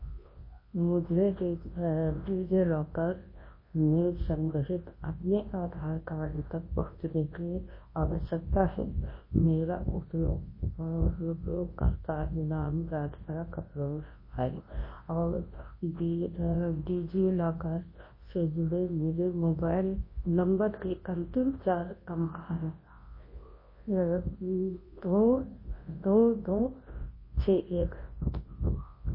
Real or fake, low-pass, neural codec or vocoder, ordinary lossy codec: fake; 5.4 kHz; codec, 24 kHz, 0.9 kbps, WavTokenizer, large speech release; MP3, 24 kbps